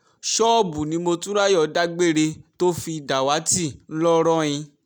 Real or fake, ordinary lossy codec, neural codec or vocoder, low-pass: real; none; none; none